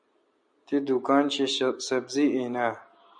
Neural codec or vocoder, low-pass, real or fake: none; 9.9 kHz; real